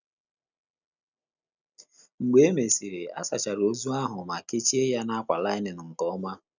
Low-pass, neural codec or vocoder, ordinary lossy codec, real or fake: 7.2 kHz; none; none; real